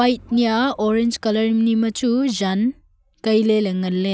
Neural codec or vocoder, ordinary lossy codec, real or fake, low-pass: none; none; real; none